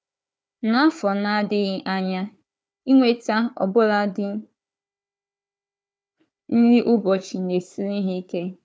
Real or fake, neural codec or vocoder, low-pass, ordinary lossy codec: fake; codec, 16 kHz, 4 kbps, FunCodec, trained on Chinese and English, 50 frames a second; none; none